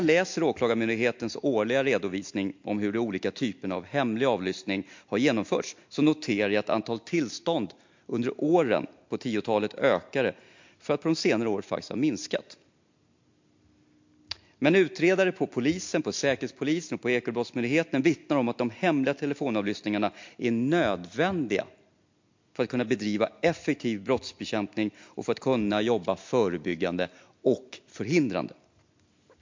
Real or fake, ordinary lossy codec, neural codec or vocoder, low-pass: real; MP3, 48 kbps; none; 7.2 kHz